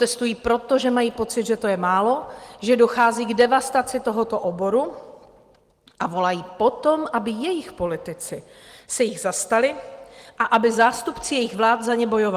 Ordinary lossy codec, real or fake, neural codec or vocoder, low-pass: Opus, 24 kbps; real; none; 14.4 kHz